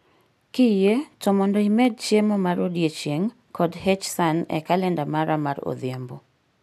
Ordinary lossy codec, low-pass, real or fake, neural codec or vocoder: MP3, 96 kbps; 14.4 kHz; real; none